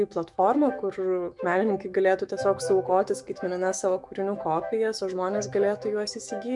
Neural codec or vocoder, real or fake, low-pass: autoencoder, 48 kHz, 128 numbers a frame, DAC-VAE, trained on Japanese speech; fake; 10.8 kHz